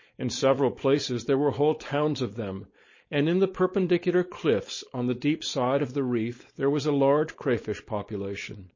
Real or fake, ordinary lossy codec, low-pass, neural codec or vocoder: fake; MP3, 32 kbps; 7.2 kHz; codec, 16 kHz, 4.8 kbps, FACodec